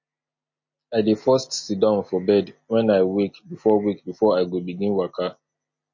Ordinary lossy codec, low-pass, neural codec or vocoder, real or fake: MP3, 32 kbps; 7.2 kHz; none; real